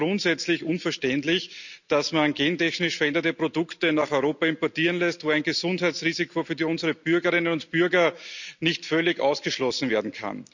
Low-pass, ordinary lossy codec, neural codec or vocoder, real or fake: 7.2 kHz; none; none; real